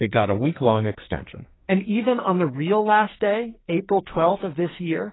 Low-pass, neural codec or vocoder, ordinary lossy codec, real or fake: 7.2 kHz; codec, 44.1 kHz, 2.6 kbps, SNAC; AAC, 16 kbps; fake